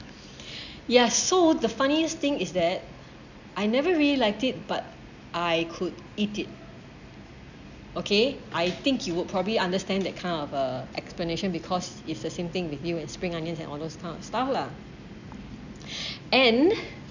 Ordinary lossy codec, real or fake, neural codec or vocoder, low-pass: none; real; none; 7.2 kHz